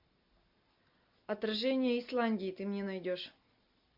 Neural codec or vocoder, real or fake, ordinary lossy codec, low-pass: none; real; MP3, 48 kbps; 5.4 kHz